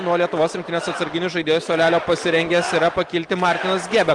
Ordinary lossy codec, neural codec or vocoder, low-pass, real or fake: Opus, 24 kbps; none; 10.8 kHz; real